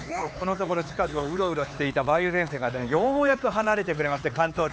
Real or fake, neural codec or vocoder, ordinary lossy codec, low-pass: fake; codec, 16 kHz, 4 kbps, X-Codec, HuBERT features, trained on LibriSpeech; none; none